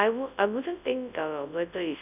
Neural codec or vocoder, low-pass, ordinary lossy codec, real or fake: codec, 24 kHz, 0.9 kbps, WavTokenizer, large speech release; 3.6 kHz; none; fake